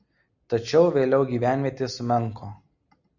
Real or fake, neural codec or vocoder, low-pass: real; none; 7.2 kHz